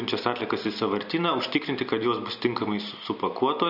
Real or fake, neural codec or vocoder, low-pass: real; none; 5.4 kHz